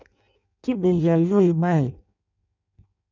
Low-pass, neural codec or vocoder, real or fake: 7.2 kHz; codec, 16 kHz in and 24 kHz out, 0.6 kbps, FireRedTTS-2 codec; fake